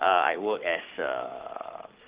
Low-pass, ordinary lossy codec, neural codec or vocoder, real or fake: 3.6 kHz; Opus, 24 kbps; codec, 44.1 kHz, 7.8 kbps, Pupu-Codec; fake